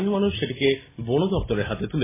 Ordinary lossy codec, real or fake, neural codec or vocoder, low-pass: MP3, 16 kbps; real; none; 3.6 kHz